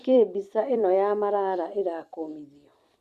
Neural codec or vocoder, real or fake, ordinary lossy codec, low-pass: vocoder, 44.1 kHz, 128 mel bands every 256 samples, BigVGAN v2; fake; Opus, 64 kbps; 14.4 kHz